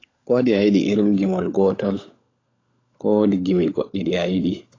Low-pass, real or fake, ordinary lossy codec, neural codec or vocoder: 7.2 kHz; fake; AAC, 32 kbps; codec, 16 kHz, 16 kbps, FunCodec, trained on LibriTTS, 50 frames a second